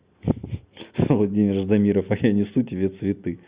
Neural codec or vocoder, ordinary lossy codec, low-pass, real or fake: none; Opus, 64 kbps; 3.6 kHz; real